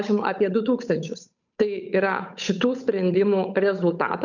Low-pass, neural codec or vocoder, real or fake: 7.2 kHz; codec, 16 kHz, 8 kbps, FunCodec, trained on Chinese and English, 25 frames a second; fake